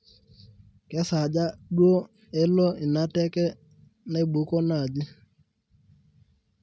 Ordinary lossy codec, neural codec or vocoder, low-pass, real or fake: none; none; none; real